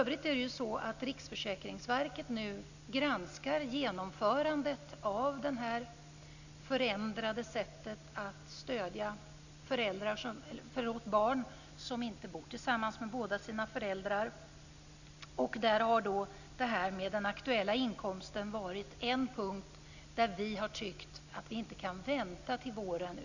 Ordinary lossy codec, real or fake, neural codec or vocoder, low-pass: none; real; none; 7.2 kHz